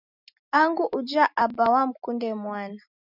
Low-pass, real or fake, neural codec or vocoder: 5.4 kHz; real; none